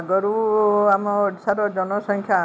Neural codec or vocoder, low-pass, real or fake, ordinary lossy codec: none; none; real; none